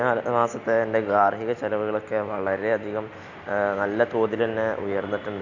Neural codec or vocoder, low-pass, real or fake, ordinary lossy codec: none; 7.2 kHz; real; none